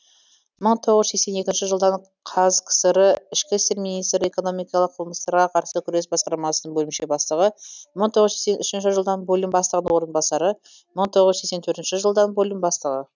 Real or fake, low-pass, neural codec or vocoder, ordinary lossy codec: real; 7.2 kHz; none; none